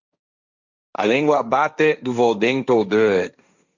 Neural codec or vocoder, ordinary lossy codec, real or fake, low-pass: codec, 16 kHz, 1.1 kbps, Voila-Tokenizer; Opus, 64 kbps; fake; 7.2 kHz